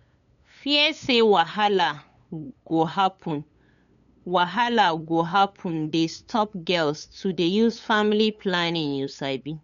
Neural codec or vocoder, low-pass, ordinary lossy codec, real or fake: codec, 16 kHz, 8 kbps, FunCodec, trained on LibriTTS, 25 frames a second; 7.2 kHz; none; fake